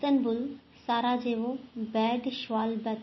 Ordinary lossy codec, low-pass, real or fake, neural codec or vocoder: MP3, 24 kbps; 7.2 kHz; real; none